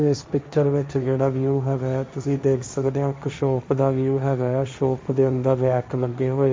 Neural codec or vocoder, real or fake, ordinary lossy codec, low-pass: codec, 16 kHz, 1.1 kbps, Voila-Tokenizer; fake; none; none